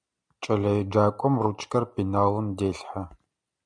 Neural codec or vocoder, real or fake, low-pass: none; real; 9.9 kHz